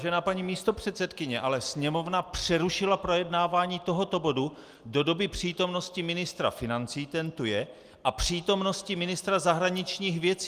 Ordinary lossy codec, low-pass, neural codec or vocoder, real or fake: Opus, 32 kbps; 14.4 kHz; none; real